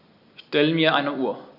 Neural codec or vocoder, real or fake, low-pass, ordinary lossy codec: none; real; 5.4 kHz; none